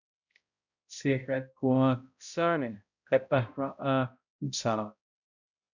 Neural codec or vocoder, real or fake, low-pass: codec, 16 kHz, 0.5 kbps, X-Codec, HuBERT features, trained on balanced general audio; fake; 7.2 kHz